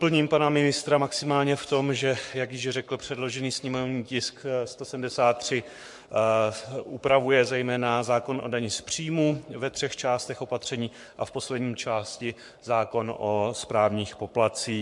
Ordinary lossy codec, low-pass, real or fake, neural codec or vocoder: MP3, 64 kbps; 10.8 kHz; fake; codec, 44.1 kHz, 7.8 kbps, Pupu-Codec